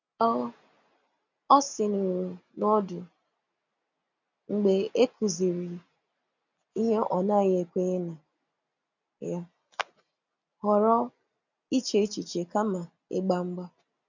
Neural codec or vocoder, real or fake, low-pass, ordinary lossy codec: none; real; 7.2 kHz; none